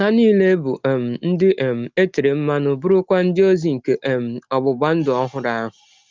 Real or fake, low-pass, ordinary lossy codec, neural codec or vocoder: real; 7.2 kHz; Opus, 24 kbps; none